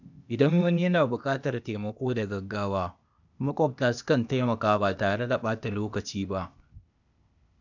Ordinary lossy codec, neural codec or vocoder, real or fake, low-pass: none; codec, 16 kHz, 0.8 kbps, ZipCodec; fake; 7.2 kHz